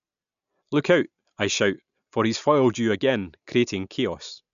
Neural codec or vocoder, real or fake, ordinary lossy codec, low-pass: none; real; none; 7.2 kHz